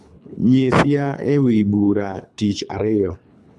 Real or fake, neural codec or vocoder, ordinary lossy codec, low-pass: fake; codec, 24 kHz, 3 kbps, HILCodec; none; none